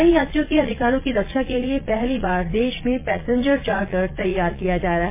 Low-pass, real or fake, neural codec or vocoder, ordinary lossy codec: 3.6 kHz; fake; vocoder, 22.05 kHz, 80 mel bands, Vocos; MP3, 24 kbps